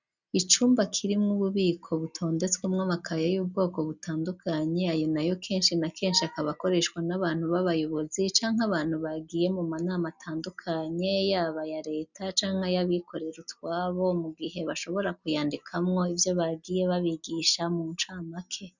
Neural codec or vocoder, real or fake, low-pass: none; real; 7.2 kHz